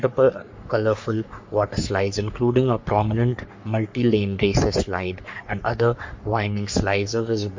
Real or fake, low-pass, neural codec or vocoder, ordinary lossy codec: fake; 7.2 kHz; codec, 44.1 kHz, 3.4 kbps, Pupu-Codec; MP3, 48 kbps